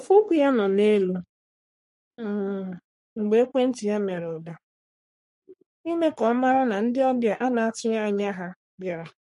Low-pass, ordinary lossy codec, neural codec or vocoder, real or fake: 14.4 kHz; MP3, 48 kbps; codec, 44.1 kHz, 3.4 kbps, Pupu-Codec; fake